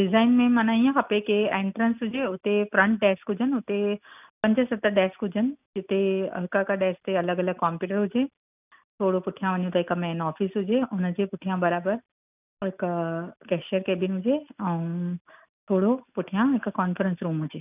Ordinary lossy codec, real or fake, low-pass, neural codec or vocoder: none; real; 3.6 kHz; none